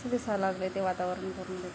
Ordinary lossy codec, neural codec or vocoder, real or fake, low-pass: none; none; real; none